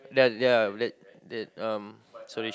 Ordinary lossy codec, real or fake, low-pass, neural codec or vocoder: none; real; none; none